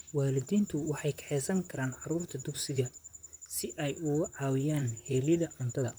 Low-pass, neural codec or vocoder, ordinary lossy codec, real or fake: none; vocoder, 44.1 kHz, 128 mel bands, Pupu-Vocoder; none; fake